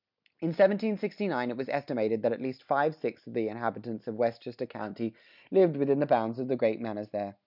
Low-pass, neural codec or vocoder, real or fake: 5.4 kHz; none; real